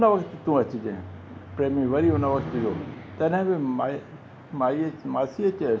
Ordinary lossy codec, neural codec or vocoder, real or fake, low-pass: none; none; real; none